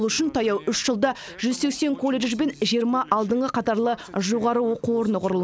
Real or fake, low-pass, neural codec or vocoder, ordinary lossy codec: real; none; none; none